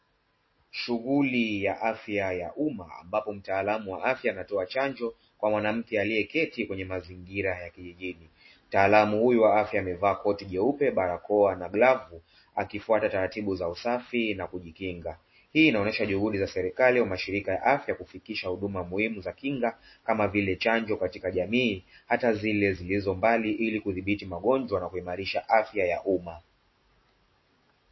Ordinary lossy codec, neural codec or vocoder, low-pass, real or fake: MP3, 24 kbps; none; 7.2 kHz; real